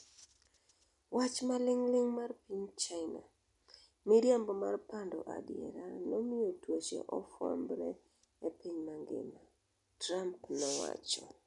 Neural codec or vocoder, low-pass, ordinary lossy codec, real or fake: none; 10.8 kHz; none; real